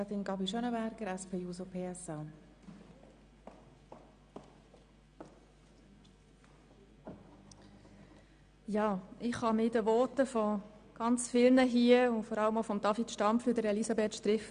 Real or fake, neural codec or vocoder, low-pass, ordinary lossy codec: real; none; 9.9 kHz; Opus, 64 kbps